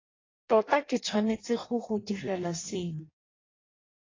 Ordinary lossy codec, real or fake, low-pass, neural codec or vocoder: AAC, 32 kbps; fake; 7.2 kHz; codec, 16 kHz in and 24 kHz out, 0.6 kbps, FireRedTTS-2 codec